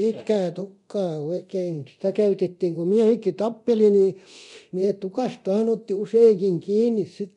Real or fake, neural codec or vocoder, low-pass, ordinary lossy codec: fake; codec, 24 kHz, 0.9 kbps, DualCodec; 10.8 kHz; none